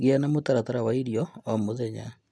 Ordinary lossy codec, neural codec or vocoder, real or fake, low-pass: none; none; real; none